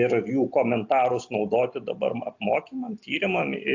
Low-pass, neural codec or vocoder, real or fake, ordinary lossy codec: 7.2 kHz; none; real; AAC, 48 kbps